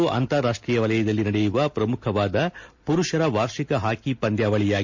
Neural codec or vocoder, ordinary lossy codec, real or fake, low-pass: none; MP3, 48 kbps; real; 7.2 kHz